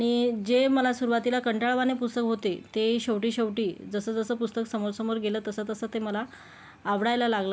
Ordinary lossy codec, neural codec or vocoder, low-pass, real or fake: none; none; none; real